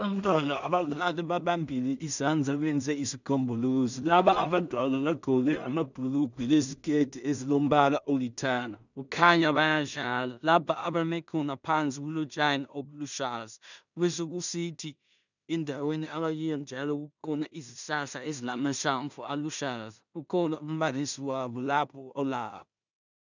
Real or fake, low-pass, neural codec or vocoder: fake; 7.2 kHz; codec, 16 kHz in and 24 kHz out, 0.4 kbps, LongCat-Audio-Codec, two codebook decoder